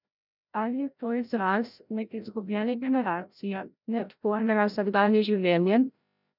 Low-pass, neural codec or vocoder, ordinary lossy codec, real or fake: 5.4 kHz; codec, 16 kHz, 0.5 kbps, FreqCodec, larger model; none; fake